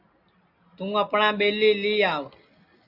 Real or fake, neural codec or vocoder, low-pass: real; none; 5.4 kHz